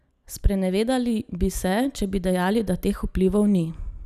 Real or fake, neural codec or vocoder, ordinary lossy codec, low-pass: real; none; none; 14.4 kHz